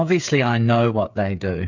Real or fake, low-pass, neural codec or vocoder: fake; 7.2 kHz; codec, 16 kHz, 8 kbps, FreqCodec, smaller model